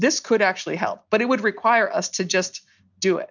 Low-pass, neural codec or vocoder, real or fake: 7.2 kHz; none; real